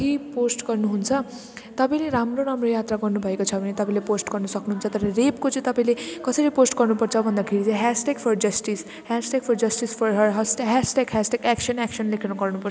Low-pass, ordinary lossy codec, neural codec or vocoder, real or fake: none; none; none; real